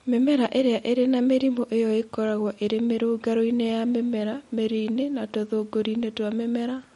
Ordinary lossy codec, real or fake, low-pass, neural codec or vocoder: MP3, 48 kbps; real; 19.8 kHz; none